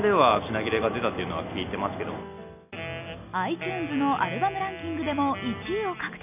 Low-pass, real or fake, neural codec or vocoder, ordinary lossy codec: 3.6 kHz; real; none; none